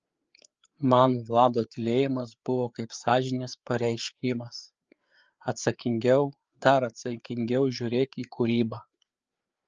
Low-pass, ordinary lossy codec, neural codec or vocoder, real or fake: 7.2 kHz; Opus, 24 kbps; codec, 16 kHz, 4 kbps, FreqCodec, larger model; fake